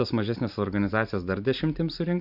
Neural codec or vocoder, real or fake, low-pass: vocoder, 44.1 kHz, 128 mel bands every 512 samples, BigVGAN v2; fake; 5.4 kHz